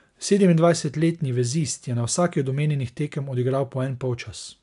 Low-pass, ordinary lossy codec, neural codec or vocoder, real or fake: 10.8 kHz; AAC, 96 kbps; none; real